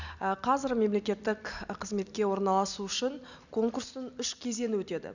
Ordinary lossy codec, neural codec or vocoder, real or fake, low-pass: none; none; real; 7.2 kHz